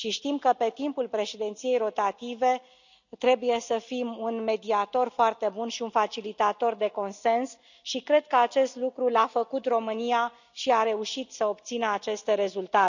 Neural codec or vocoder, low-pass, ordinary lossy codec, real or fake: none; 7.2 kHz; none; real